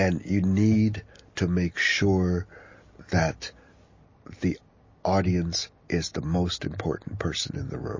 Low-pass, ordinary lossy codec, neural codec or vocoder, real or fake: 7.2 kHz; MP3, 32 kbps; none; real